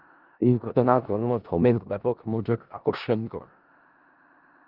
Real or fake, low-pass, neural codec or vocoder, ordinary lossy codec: fake; 5.4 kHz; codec, 16 kHz in and 24 kHz out, 0.4 kbps, LongCat-Audio-Codec, four codebook decoder; Opus, 32 kbps